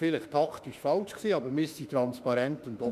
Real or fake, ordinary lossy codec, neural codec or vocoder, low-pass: fake; none; autoencoder, 48 kHz, 32 numbers a frame, DAC-VAE, trained on Japanese speech; 14.4 kHz